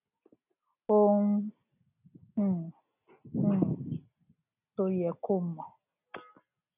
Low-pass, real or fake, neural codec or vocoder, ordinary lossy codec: 3.6 kHz; real; none; none